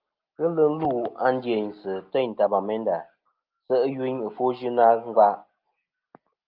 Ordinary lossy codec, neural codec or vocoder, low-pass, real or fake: Opus, 32 kbps; none; 5.4 kHz; real